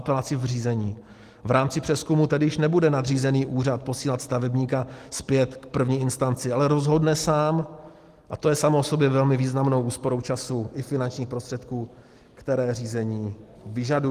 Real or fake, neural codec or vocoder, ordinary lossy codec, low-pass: real; none; Opus, 24 kbps; 14.4 kHz